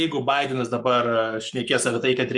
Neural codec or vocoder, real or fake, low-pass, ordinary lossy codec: codec, 44.1 kHz, 7.8 kbps, Pupu-Codec; fake; 10.8 kHz; Opus, 64 kbps